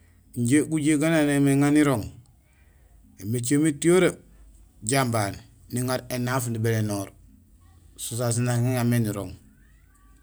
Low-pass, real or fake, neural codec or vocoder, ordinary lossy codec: none; real; none; none